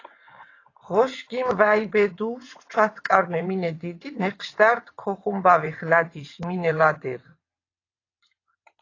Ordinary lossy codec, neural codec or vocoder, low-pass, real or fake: AAC, 32 kbps; vocoder, 22.05 kHz, 80 mel bands, WaveNeXt; 7.2 kHz; fake